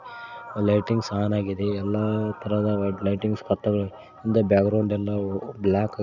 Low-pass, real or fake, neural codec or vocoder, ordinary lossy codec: 7.2 kHz; real; none; none